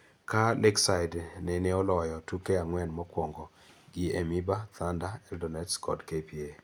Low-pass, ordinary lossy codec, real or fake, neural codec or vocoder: none; none; real; none